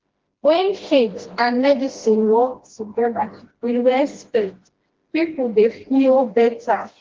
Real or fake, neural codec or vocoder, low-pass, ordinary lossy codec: fake; codec, 16 kHz, 1 kbps, FreqCodec, smaller model; 7.2 kHz; Opus, 16 kbps